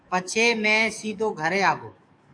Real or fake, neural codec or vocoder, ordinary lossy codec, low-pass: fake; codec, 44.1 kHz, 7.8 kbps, DAC; AAC, 64 kbps; 9.9 kHz